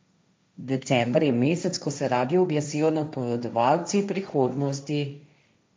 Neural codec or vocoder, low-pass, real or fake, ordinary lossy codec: codec, 16 kHz, 1.1 kbps, Voila-Tokenizer; none; fake; none